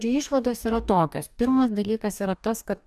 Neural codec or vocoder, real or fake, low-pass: codec, 44.1 kHz, 2.6 kbps, DAC; fake; 14.4 kHz